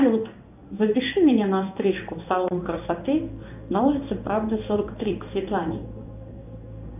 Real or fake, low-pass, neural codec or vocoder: fake; 3.6 kHz; codec, 16 kHz in and 24 kHz out, 1 kbps, XY-Tokenizer